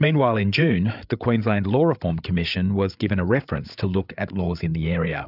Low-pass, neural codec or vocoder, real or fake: 5.4 kHz; codec, 16 kHz, 8 kbps, FreqCodec, larger model; fake